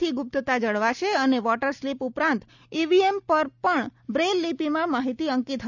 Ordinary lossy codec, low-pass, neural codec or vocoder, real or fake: none; 7.2 kHz; none; real